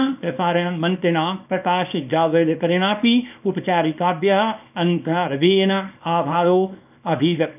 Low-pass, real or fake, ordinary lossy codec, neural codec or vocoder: 3.6 kHz; fake; none; codec, 24 kHz, 0.9 kbps, WavTokenizer, small release